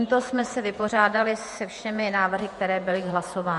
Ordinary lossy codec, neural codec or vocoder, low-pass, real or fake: MP3, 48 kbps; vocoder, 48 kHz, 128 mel bands, Vocos; 14.4 kHz; fake